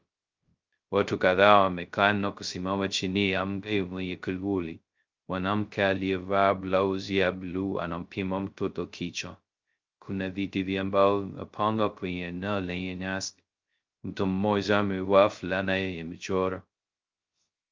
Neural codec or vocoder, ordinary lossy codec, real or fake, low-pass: codec, 16 kHz, 0.2 kbps, FocalCodec; Opus, 24 kbps; fake; 7.2 kHz